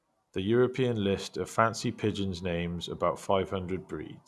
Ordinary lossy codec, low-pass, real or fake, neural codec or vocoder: none; none; real; none